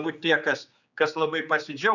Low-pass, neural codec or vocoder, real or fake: 7.2 kHz; codec, 16 kHz, 4 kbps, X-Codec, HuBERT features, trained on general audio; fake